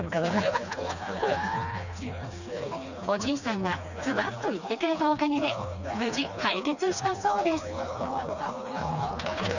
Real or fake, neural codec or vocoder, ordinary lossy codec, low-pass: fake; codec, 16 kHz, 2 kbps, FreqCodec, smaller model; none; 7.2 kHz